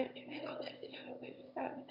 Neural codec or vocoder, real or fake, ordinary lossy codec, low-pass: autoencoder, 22.05 kHz, a latent of 192 numbers a frame, VITS, trained on one speaker; fake; Opus, 64 kbps; 5.4 kHz